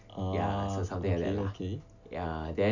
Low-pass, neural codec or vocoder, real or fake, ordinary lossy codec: 7.2 kHz; none; real; none